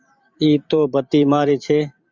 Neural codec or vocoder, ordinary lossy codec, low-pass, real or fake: vocoder, 44.1 kHz, 128 mel bands every 512 samples, BigVGAN v2; Opus, 64 kbps; 7.2 kHz; fake